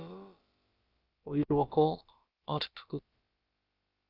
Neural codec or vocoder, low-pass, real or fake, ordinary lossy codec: codec, 16 kHz, about 1 kbps, DyCAST, with the encoder's durations; 5.4 kHz; fake; Opus, 24 kbps